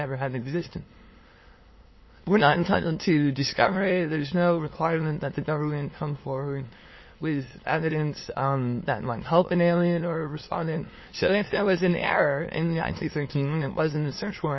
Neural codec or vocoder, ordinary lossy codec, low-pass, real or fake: autoencoder, 22.05 kHz, a latent of 192 numbers a frame, VITS, trained on many speakers; MP3, 24 kbps; 7.2 kHz; fake